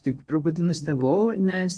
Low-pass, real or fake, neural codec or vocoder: 9.9 kHz; fake; codec, 16 kHz in and 24 kHz out, 0.6 kbps, FocalCodec, streaming, 4096 codes